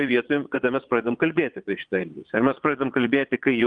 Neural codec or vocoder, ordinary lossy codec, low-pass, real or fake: vocoder, 22.05 kHz, 80 mel bands, Vocos; Opus, 32 kbps; 9.9 kHz; fake